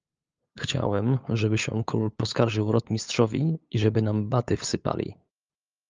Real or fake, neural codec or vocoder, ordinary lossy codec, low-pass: fake; codec, 16 kHz, 8 kbps, FunCodec, trained on LibriTTS, 25 frames a second; Opus, 24 kbps; 7.2 kHz